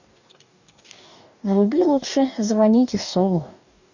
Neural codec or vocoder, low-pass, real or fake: codec, 44.1 kHz, 2.6 kbps, DAC; 7.2 kHz; fake